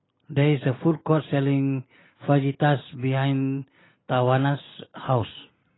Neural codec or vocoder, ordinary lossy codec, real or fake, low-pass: none; AAC, 16 kbps; real; 7.2 kHz